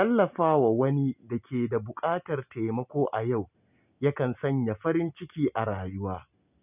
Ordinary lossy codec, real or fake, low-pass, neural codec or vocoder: none; real; 3.6 kHz; none